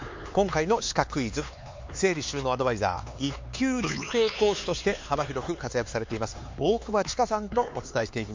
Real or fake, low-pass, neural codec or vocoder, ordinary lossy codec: fake; 7.2 kHz; codec, 16 kHz, 4 kbps, X-Codec, HuBERT features, trained on LibriSpeech; MP3, 48 kbps